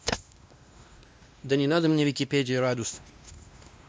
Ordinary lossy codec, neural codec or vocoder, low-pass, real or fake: none; codec, 16 kHz, 1 kbps, X-Codec, WavLM features, trained on Multilingual LibriSpeech; none; fake